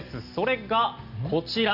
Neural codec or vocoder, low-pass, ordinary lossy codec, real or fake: none; 5.4 kHz; none; real